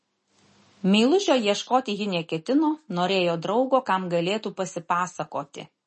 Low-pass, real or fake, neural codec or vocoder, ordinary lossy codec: 10.8 kHz; real; none; MP3, 32 kbps